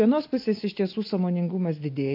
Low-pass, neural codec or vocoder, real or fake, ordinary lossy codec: 5.4 kHz; none; real; AAC, 32 kbps